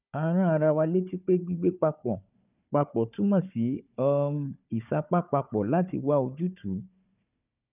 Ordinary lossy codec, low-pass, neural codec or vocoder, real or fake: none; 3.6 kHz; codec, 16 kHz, 4 kbps, FunCodec, trained on Chinese and English, 50 frames a second; fake